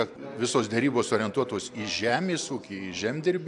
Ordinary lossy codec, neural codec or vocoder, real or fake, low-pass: AAC, 64 kbps; none; real; 10.8 kHz